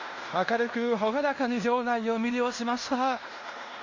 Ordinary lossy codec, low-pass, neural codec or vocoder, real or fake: Opus, 64 kbps; 7.2 kHz; codec, 16 kHz in and 24 kHz out, 0.9 kbps, LongCat-Audio-Codec, fine tuned four codebook decoder; fake